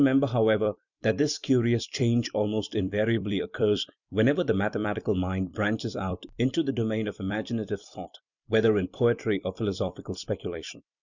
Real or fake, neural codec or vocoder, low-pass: fake; vocoder, 44.1 kHz, 128 mel bands every 256 samples, BigVGAN v2; 7.2 kHz